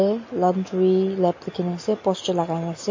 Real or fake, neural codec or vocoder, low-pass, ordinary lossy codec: real; none; 7.2 kHz; MP3, 32 kbps